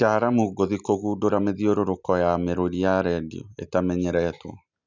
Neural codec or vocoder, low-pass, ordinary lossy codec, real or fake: none; 7.2 kHz; none; real